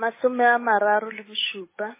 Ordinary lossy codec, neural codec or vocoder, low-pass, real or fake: MP3, 16 kbps; codec, 16 kHz, 8 kbps, FunCodec, trained on Chinese and English, 25 frames a second; 3.6 kHz; fake